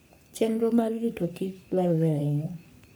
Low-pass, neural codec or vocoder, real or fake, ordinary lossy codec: none; codec, 44.1 kHz, 3.4 kbps, Pupu-Codec; fake; none